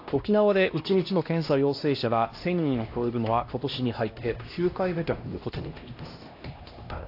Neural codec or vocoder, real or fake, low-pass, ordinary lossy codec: codec, 16 kHz, 1 kbps, X-Codec, HuBERT features, trained on LibriSpeech; fake; 5.4 kHz; AAC, 32 kbps